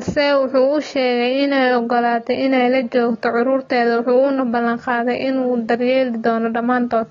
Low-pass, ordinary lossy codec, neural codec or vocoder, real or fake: 7.2 kHz; AAC, 32 kbps; none; real